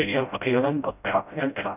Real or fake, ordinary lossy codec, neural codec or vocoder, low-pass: fake; none; codec, 16 kHz, 0.5 kbps, FreqCodec, smaller model; 3.6 kHz